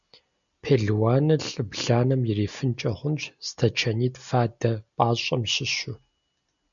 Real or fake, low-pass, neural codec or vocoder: real; 7.2 kHz; none